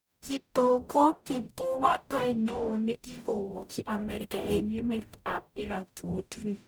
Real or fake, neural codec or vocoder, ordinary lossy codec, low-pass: fake; codec, 44.1 kHz, 0.9 kbps, DAC; none; none